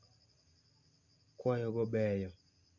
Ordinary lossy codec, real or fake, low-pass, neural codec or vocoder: none; real; 7.2 kHz; none